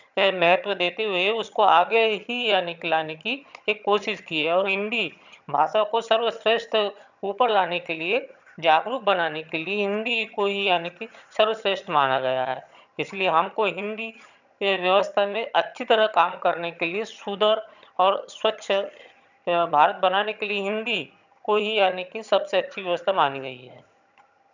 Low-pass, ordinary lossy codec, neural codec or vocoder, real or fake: 7.2 kHz; none; vocoder, 22.05 kHz, 80 mel bands, HiFi-GAN; fake